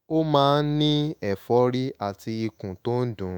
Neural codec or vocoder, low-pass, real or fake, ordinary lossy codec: autoencoder, 48 kHz, 128 numbers a frame, DAC-VAE, trained on Japanese speech; none; fake; none